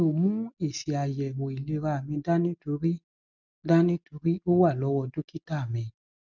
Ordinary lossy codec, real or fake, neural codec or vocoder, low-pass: none; real; none; 7.2 kHz